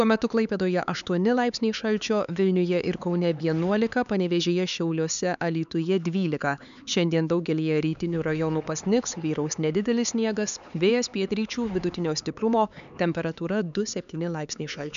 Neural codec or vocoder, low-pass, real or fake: codec, 16 kHz, 4 kbps, X-Codec, HuBERT features, trained on LibriSpeech; 7.2 kHz; fake